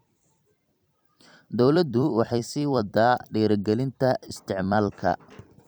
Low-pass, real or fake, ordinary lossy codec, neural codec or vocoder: none; fake; none; vocoder, 44.1 kHz, 128 mel bands every 256 samples, BigVGAN v2